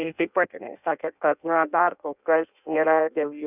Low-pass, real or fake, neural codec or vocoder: 3.6 kHz; fake; codec, 16 kHz in and 24 kHz out, 0.6 kbps, FireRedTTS-2 codec